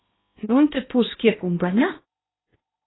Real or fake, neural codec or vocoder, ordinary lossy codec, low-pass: fake; codec, 16 kHz in and 24 kHz out, 0.8 kbps, FocalCodec, streaming, 65536 codes; AAC, 16 kbps; 7.2 kHz